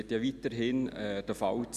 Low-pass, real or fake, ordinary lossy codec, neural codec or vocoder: 14.4 kHz; real; none; none